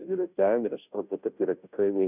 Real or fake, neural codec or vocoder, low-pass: fake; codec, 16 kHz, 0.5 kbps, FunCodec, trained on Chinese and English, 25 frames a second; 3.6 kHz